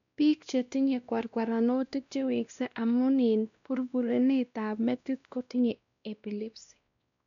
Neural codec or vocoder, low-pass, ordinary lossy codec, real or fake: codec, 16 kHz, 1 kbps, X-Codec, WavLM features, trained on Multilingual LibriSpeech; 7.2 kHz; none; fake